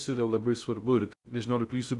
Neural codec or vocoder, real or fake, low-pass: codec, 16 kHz in and 24 kHz out, 0.6 kbps, FocalCodec, streaming, 2048 codes; fake; 10.8 kHz